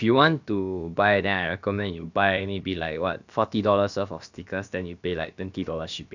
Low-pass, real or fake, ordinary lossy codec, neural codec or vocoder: 7.2 kHz; fake; none; codec, 16 kHz, about 1 kbps, DyCAST, with the encoder's durations